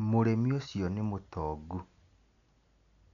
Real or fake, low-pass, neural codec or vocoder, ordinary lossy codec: real; 7.2 kHz; none; none